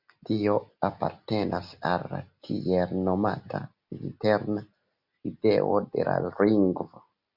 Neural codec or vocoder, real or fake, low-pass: none; real; 5.4 kHz